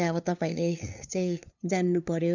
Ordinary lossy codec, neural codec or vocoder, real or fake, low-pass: none; codec, 16 kHz, 2 kbps, FunCodec, trained on LibriTTS, 25 frames a second; fake; 7.2 kHz